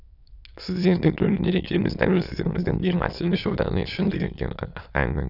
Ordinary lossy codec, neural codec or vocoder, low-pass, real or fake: none; autoencoder, 22.05 kHz, a latent of 192 numbers a frame, VITS, trained on many speakers; 5.4 kHz; fake